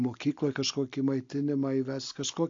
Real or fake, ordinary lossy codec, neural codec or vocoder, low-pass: real; AAC, 48 kbps; none; 7.2 kHz